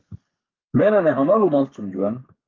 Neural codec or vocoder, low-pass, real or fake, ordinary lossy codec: codec, 32 kHz, 1.9 kbps, SNAC; 7.2 kHz; fake; Opus, 32 kbps